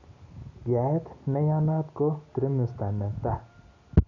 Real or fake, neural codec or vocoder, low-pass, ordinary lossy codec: real; none; 7.2 kHz; none